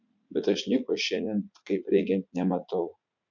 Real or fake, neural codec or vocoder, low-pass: fake; vocoder, 44.1 kHz, 80 mel bands, Vocos; 7.2 kHz